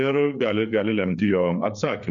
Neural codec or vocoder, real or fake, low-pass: codec, 16 kHz, 4 kbps, FreqCodec, larger model; fake; 7.2 kHz